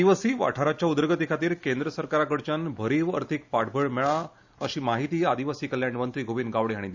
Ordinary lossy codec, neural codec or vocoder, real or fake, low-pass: Opus, 64 kbps; none; real; 7.2 kHz